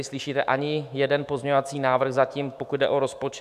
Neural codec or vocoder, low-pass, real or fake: autoencoder, 48 kHz, 128 numbers a frame, DAC-VAE, trained on Japanese speech; 14.4 kHz; fake